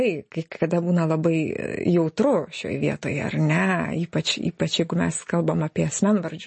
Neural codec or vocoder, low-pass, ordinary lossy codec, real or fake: none; 10.8 kHz; MP3, 32 kbps; real